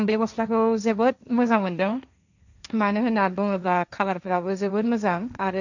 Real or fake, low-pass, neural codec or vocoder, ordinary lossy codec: fake; 7.2 kHz; codec, 16 kHz, 1.1 kbps, Voila-Tokenizer; none